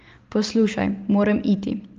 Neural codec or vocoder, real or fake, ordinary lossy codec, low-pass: none; real; Opus, 32 kbps; 7.2 kHz